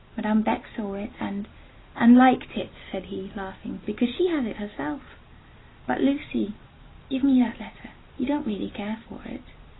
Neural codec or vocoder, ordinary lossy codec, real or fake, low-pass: none; AAC, 16 kbps; real; 7.2 kHz